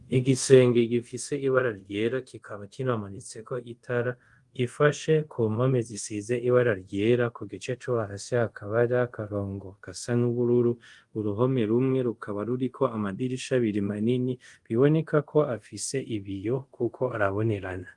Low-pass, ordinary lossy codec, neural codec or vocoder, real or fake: 10.8 kHz; Opus, 24 kbps; codec, 24 kHz, 0.5 kbps, DualCodec; fake